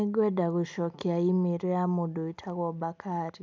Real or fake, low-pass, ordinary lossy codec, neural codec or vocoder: real; none; none; none